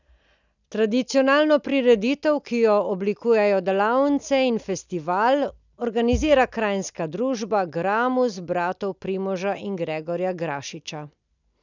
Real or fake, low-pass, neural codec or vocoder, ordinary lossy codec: real; 7.2 kHz; none; none